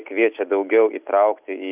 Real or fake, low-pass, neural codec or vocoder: real; 3.6 kHz; none